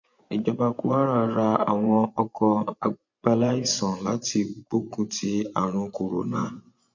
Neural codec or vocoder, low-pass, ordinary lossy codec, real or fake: vocoder, 24 kHz, 100 mel bands, Vocos; 7.2 kHz; MP3, 48 kbps; fake